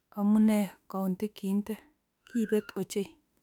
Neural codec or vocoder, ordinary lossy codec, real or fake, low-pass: autoencoder, 48 kHz, 32 numbers a frame, DAC-VAE, trained on Japanese speech; none; fake; 19.8 kHz